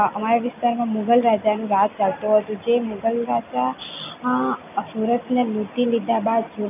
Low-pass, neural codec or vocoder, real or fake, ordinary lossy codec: 3.6 kHz; none; real; none